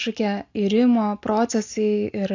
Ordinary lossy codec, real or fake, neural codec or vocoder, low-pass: AAC, 48 kbps; real; none; 7.2 kHz